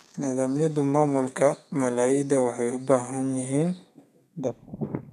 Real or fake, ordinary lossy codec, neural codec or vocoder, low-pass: fake; none; codec, 32 kHz, 1.9 kbps, SNAC; 14.4 kHz